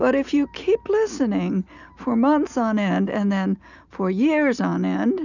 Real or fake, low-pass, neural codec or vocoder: real; 7.2 kHz; none